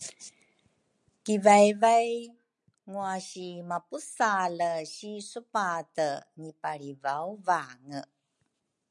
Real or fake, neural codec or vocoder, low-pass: real; none; 10.8 kHz